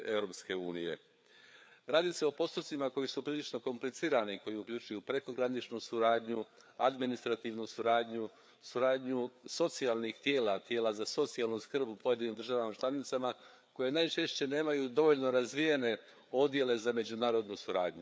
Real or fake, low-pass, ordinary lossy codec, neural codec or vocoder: fake; none; none; codec, 16 kHz, 4 kbps, FreqCodec, larger model